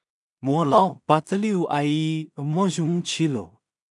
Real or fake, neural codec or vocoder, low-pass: fake; codec, 16 kHz in and 24 kHz out, 0.4 kbps, LongCat-Audio-Codec, two codebook decoder; 10.8 kHz